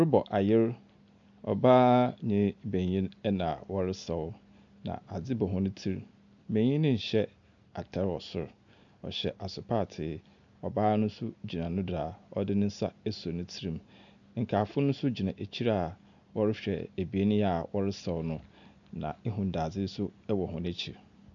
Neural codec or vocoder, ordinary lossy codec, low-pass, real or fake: none; MP3, 96 kbps; 7.2 kHz; real